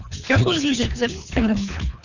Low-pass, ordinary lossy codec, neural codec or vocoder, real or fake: 7.2 kHz; none; codec, 24 kHz, 3 kbps, HILCodec; fake